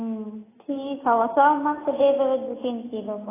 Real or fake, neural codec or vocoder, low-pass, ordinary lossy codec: real; none; 3.6 kHz; AAC, 16 kbps